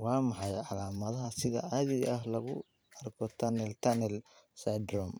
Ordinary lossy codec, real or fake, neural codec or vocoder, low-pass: none; fake; vocoder, 44.1 kHz, 128 mel bands every 512 samples, BigVGAN v2; none